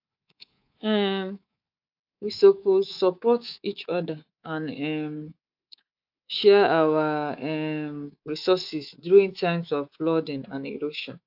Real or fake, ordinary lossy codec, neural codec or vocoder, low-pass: fake; AAC, 48 kbps; codec, 24 kHz, 3.1 kbps, DualCodec; 5.4 kHz